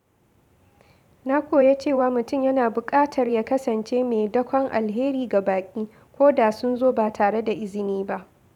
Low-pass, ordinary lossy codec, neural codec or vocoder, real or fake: 19.8 kHz; none; vocoder, 44.1 kHz, 128 mel bands every 512 samples, BigVGAN v2; fake